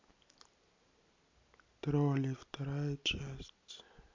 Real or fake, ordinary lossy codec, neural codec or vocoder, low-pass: real; MP3, 64 kbps; none; 7.2 kHz